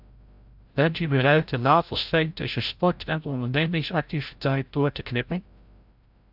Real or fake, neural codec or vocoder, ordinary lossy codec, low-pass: fake; codec, 16 kHz, 0.5 kbps, FreqCodec, larger model; AAC, 48 kbps; 5.4 kHz